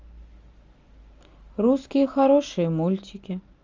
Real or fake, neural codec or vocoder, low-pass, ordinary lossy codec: real; none; 7.2 kHz; Opus, 32 kbps